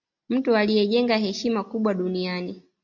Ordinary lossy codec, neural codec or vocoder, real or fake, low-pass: MP3, 64 kbps; none; real; 7.2 kHz